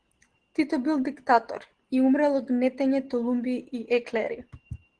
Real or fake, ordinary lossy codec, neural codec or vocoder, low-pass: real; Opus, 16 kbps; none; 9.9 kHz